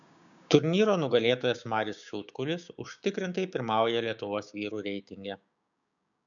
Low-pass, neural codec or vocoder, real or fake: 7.2 kHz; codec, 16 kHz, 6 kbps, DAC; fake